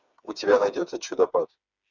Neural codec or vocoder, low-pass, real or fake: codec, 16 kHz, 4 kbps, FreqCodec, smaller model; 7.2 kHz; fake